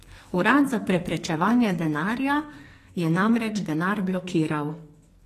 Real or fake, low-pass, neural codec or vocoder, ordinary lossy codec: fake; 14.4 kHz; codec, 44.1 kHz, 2.6 kbps, SNAC; AAC, 48 kbps